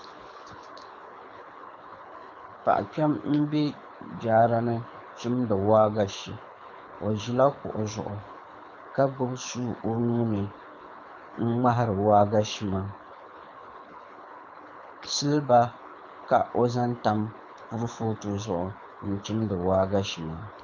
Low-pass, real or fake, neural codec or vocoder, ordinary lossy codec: 7.2 kHz; fake; codec, 24 kHz, 6 kbps, HILCodec; MP3, 64 kbps